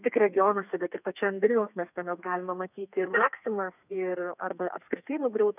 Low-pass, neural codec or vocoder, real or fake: 3.6 kHz; codec, 32 kHz, 1.9 kbps, SNAC; fake